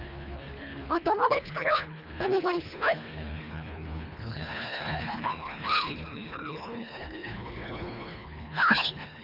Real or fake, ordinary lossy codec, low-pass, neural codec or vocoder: fake; none; 5.4 kHz; codec, 24 kHz, 1.5 kbps, HILCodec